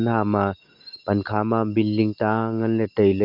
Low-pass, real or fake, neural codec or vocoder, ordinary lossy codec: 5.4 kHz; real; none; Opus, 32 kbps